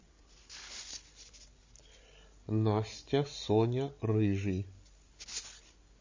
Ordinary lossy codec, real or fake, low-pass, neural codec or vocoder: MP3, 32 kbps; fake; 7.2 kHz; codec, 16 kHz, 16 kbps, FreqCodec, larger model